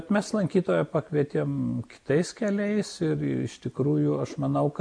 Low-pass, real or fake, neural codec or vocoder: 9.9 kHz; real; none